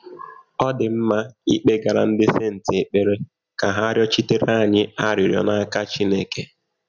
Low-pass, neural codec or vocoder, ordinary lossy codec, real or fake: 7.2 kHz; none; none; real